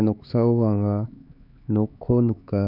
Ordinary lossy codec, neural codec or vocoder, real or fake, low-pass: none; codec, 16 kHz, 4 kbps, X-Codec, HuBERT features, trained on LibriSpeech; fake; 5.4 kHz